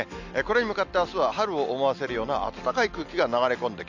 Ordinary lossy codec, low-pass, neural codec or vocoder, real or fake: none; 7.2 kHz; none; real